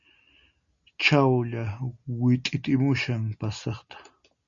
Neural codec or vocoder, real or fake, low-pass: none; real; 7.2 kHz